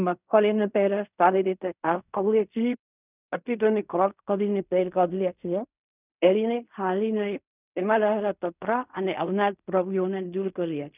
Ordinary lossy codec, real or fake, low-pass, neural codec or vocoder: none; fake; 3.6 kHz; codec, 16 kHz in and 24 kHz out, 0.4 kbps, LongCat-Audio-Codec, fine tuned four codebook decoder